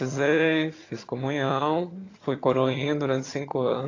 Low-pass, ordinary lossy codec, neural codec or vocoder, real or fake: 7.2 kHz; AAC, 32 kbps; vocoder, 22.05 kHz, 80 mel bands, HiFi-GAN; fake